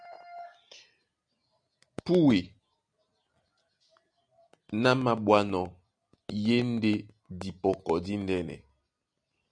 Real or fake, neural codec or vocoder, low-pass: real; none; 9.9 kHz